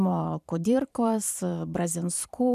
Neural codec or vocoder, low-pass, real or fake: vocoder, 44.1 kHz, 128 mel bands every 512 samples, BigVGAN v2; 14.4 kHz; fake